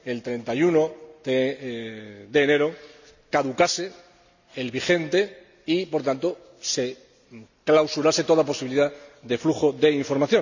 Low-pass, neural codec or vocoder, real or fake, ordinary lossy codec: 7.2 kHz; none; real; none